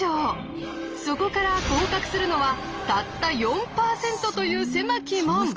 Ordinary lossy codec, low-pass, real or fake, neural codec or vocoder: Opus, 24 kbps; 7.2 kHz; real; none